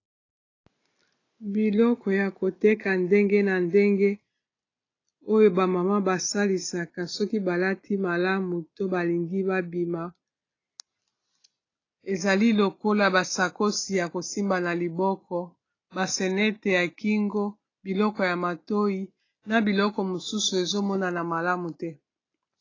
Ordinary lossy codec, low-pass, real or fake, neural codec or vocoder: AAC, 32 kbps; 7.2 kHz; real; none